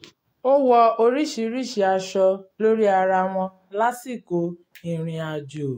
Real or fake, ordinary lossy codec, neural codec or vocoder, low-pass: fake; AAC, 48 kbps; autoencoder, 48 kHz, 128 numbers a frame, DAC-VAE, trained on Japanese speech; 19.8 kHz